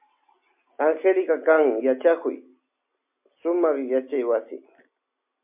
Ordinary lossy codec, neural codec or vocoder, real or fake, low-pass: MP3, 32 kbps; none; real; 3.6 kHz